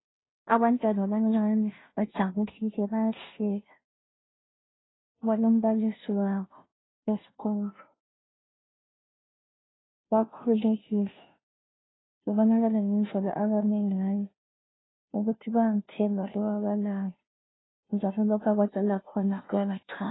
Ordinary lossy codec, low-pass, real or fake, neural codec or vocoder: AAC, 16 kbps; 7.2 kHz; fake; codec, 16 kHz, 0.5 kbps, FunCodec, trained on Chinese and English, 25 frames a second